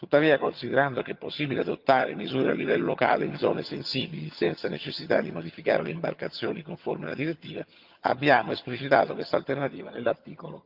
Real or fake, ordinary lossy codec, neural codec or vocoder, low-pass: fake; Opus, 32 kbps; vocoder, 22.05 kHz, 80 mel bands, HiFi-GAN; 5.4 kHz